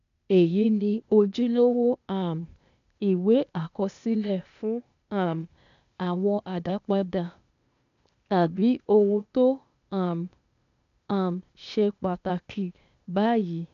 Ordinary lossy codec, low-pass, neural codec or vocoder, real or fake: none; 7.2 kHz; codec, 16 kHz, 0.8 kbps, ZipCodec; fake